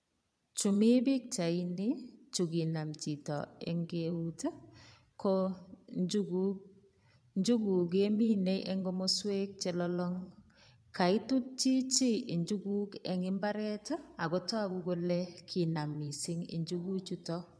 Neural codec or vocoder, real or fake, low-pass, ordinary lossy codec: vocoder, 22.05 kHz, 80 mel bands, Vocos; fake; none; none